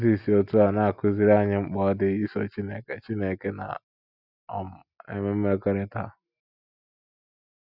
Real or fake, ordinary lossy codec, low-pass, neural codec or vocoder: real; none; 5.4 kHz; none